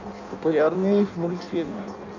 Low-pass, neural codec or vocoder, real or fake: 7.2 kHz; codec, 16 kHz in and 24 kHz out, 0.6 kbps, FireRedTTS-2 codec; fake